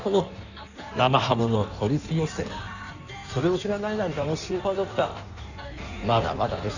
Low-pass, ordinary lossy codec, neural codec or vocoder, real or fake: 7.2 kHz; none; codec, 16 kHz in and 24 kHz out, 1.1 kbps, FireRedTTS-2 codec; fake